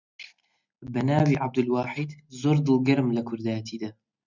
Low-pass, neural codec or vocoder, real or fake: 7.2 kHz; none; real